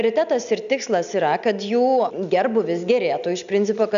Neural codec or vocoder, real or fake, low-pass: none; real; 7.2 kHz